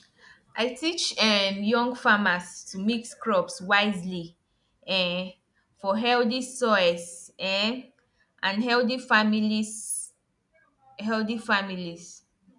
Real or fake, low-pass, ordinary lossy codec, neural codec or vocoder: real; 10.8 kHz; none; none